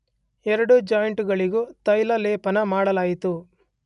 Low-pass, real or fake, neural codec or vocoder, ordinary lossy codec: 10.8 kHz; real; none; none